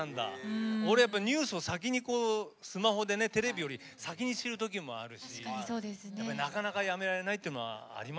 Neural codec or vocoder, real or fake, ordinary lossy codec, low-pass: none; real; none; none